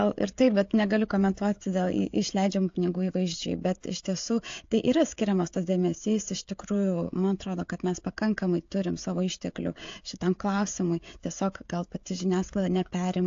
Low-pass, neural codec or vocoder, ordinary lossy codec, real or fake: 7.2 kHz; codec, 16 kHz, 16 kbps, FreqCodec, smaller model; AAC, 48 kbps; fake